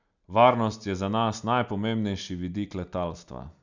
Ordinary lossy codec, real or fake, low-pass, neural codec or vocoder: none; real; 7.2 kHz; none